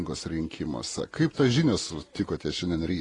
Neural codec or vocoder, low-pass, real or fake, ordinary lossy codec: none; 10.8 kHz; real; AAC, 32 kbps